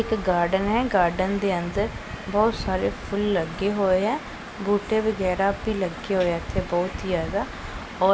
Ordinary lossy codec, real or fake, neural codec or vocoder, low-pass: none; real; none; none